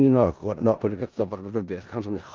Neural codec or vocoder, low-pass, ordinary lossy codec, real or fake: codec, 16 kHz in and 24 kHz out, 0.4 kbps, LongCat-Audio-Codec, four codebook decoder; 7.2 kHz; Opus, 24 kbps; fake